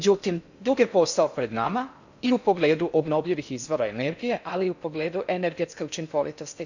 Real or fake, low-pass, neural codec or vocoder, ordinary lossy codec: fake; 7.2 kHz; codec, 16 kHz in and 24 kHz out, 0.6 kbps, FocalCodec, streaming, 4096 codes; none